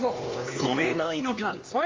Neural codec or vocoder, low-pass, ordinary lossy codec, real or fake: codec, 16 kHz, 2 kbps, X-Codec, HuBERT features, trained on LibriSpeech; 7.2 kHz; Opus, 32 kbps; fake